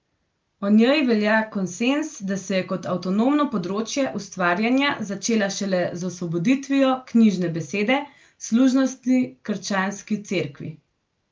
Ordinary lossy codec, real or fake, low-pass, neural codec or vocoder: Opus, 32 kbps; real; 7.2 kHz; none